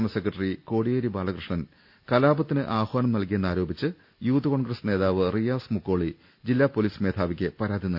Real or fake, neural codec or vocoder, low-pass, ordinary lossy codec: real; none; 5.4 kHz; none